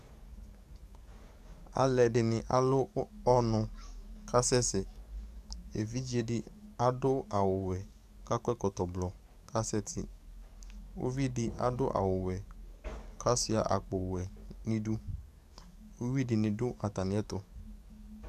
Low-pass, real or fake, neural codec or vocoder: 14.4 kHz; fake; codec, 44.1 kHz, 7.8 kbps, DAC